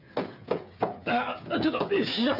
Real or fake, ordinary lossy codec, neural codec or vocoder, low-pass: fake; none; codec, 16 kHz, 8 kbps, FreqCodec, smaller model; 5.4 kHz